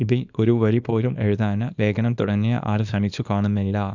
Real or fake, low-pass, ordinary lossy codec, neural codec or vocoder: fake; 7.2 kHz; none; codec, 24 kHz, 0.9 kbps, WavTokenizer, small release